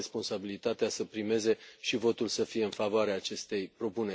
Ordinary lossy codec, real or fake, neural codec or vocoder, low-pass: none; real; none; none